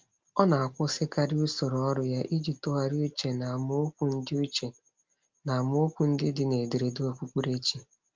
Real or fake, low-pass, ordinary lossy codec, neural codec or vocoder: real; 7.2 kHz; Opus, 24 kbps; none